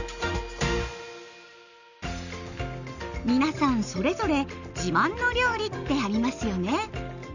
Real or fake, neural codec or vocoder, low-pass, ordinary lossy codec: real; none; 7.2 kHz; none